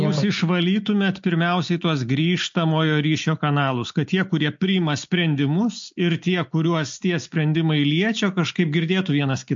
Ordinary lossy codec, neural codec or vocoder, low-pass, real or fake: MP3, 48 kbps; none; 7.2 kHz; real